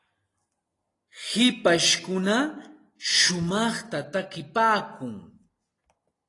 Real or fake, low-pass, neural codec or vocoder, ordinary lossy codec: real; 10.8 kHz; none; AAC, 32 kbps